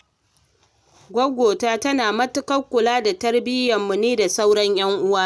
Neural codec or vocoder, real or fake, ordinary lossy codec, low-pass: none; real; none; none